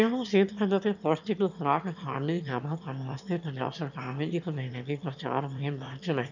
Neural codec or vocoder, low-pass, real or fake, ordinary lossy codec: autoencoder, 22.05 kHz, a latent of 192 numbers a frame, VITS, trained on one speaker; 7.2 kHz; fake; none